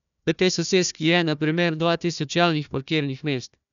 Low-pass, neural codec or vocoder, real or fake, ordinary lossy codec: 7.2 kHz; codec, 16 kHz, 1 kbps, FunCodec, trained on Chinese and English, 50 frames a second; fake; none